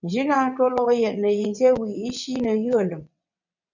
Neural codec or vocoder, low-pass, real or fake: vocoder, 22.05 kHz, 80 mel bands, WaveNeXt; 7.2 kHz; fake